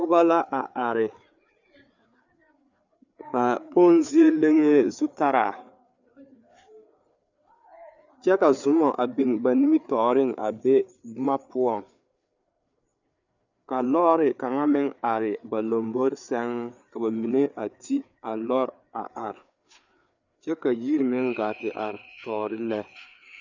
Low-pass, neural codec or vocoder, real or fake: 7.2 kHz; codec, 16 kHz, 4 kbps, FreqCodec, larger model; fake